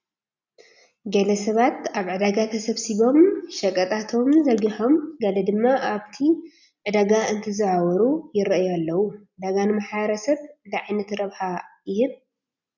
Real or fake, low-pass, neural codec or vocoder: real; 7.2 kHz; none